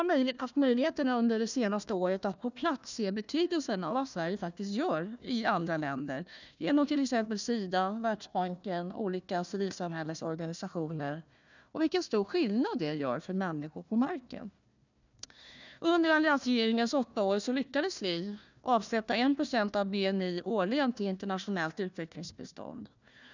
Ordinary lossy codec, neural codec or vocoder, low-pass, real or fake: none; codec, 16 kHz, 1 kbps, FunCodec, trained on Chinese and English, 50 frames a second; 7.2 kHz; fake